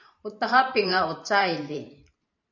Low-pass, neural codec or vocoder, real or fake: 7.2 kHz; vocoder, 44.1 kHz, 128 mel bands every 512 samples, BigVGAN v2; fake